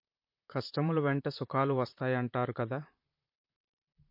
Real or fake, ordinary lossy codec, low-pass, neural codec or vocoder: fake; MP3, 32 kbps; 5.4 kHz; vocoder, 44.1 kHz, 128 mel bands, Pupu-Vocoder